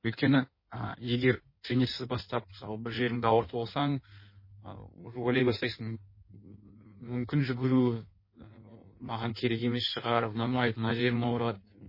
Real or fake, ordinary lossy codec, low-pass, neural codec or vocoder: fake; MP3, 24 kbps; 5.4 kHz; codec, 16 kHz in and 24 kHz out, 1.1 kbps, FireRedTTS-2 codec